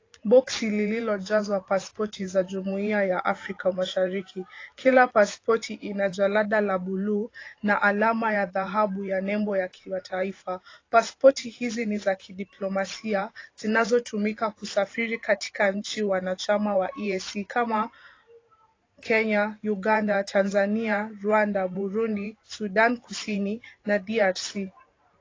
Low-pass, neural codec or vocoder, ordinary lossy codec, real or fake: 7.2 kHz; vocoder, 44.1 kHz, 128 mel bands every 512 samples, BigVGAN v2; AAC, 32 kbps; fake